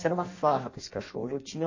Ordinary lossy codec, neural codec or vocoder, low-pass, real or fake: MP3, 32 kbps; codec, 16 kHz in and 24 kHz out, 0.6 kbps, FireRedTTS-2 codec; 7.2 kHz; fake